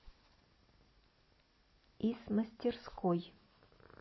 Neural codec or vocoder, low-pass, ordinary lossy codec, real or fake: none; 7.2 kHz; MP3, 24 kbps; real